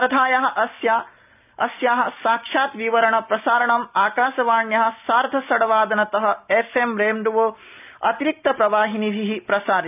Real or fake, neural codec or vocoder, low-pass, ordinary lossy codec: real; none; 3.6 kHz; none